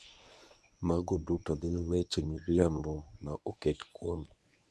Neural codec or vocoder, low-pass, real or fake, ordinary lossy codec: codec, 24 kHz, 0.9 kbps, WavTokenizer, medium speech release version 1; none; fake; none